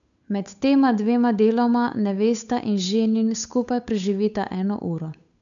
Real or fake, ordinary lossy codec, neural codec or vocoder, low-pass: fake; none; codec, 16 kHz, 8 kbps, FunCodec, trained on Chinese and English, 25 frames a second; 7.2 kHz